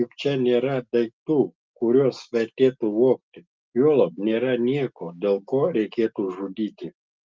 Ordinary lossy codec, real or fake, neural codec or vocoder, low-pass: Opus, 24 kbps; real; none; 7.2 kHz